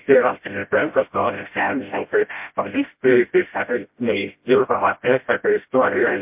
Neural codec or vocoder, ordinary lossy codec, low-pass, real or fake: codec, 16 kHz, 0.5 kbps, FreqCodec, smaller model; MP3, 32 kbps; 3.6 kHz; fake